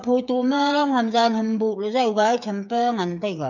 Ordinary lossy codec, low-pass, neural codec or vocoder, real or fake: none; 7.2 kHz; codec, 16 kHz, 8 kbps, FreqCodec, smaller model; fake